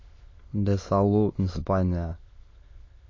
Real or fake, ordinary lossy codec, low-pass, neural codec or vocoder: fake; MP3, 32 kbps; 7.2 kHz; autoencoder, 22.05 kHz, a latent of 192 numbers a frame, VITS, trained on many speakers